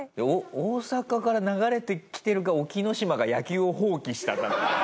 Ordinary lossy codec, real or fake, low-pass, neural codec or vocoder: none; real; none; none